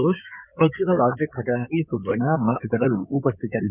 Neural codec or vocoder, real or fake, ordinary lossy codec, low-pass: codec, 16 kHz in and 24 kHz out, 2.2 kbps, FireRedTTS-2 codec; fake; none; 3.6 kHz